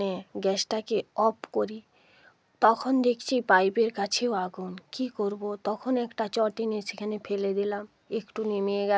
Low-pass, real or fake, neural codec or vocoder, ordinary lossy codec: none; real; none; none